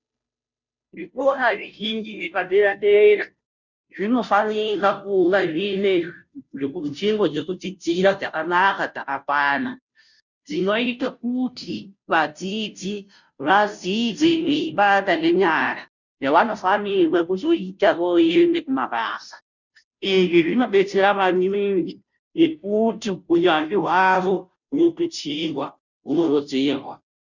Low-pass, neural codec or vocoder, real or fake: 7.2 kHz; codec, 16 kHz, 0.5 kbps, FunCodec, trained on Chinese and English, 25 frames a second; fake